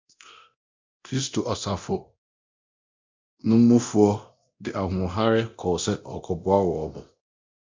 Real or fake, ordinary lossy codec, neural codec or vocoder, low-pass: fake; MP3, 64 kbps; codec, 24 kHz, 0.9 kbps, DualCodec; 7.2 kHz